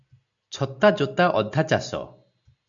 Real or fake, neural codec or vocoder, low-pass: real; none; 7.2 kHz